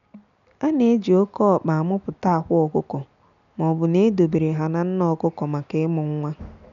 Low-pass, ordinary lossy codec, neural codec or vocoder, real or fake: 7.2 kHz; none; none; real